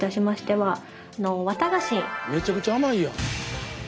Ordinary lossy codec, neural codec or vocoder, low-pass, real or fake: none; none; none; real